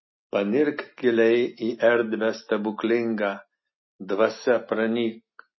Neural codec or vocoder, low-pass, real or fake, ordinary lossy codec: none; 7.2 kHz; real; MP3, 24 kbps